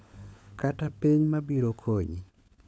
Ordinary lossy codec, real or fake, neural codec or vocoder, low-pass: none; fake; codec, 16 kHz, 16 kbps, FunCodec, trained on LibriTTS, 50 frames a second; none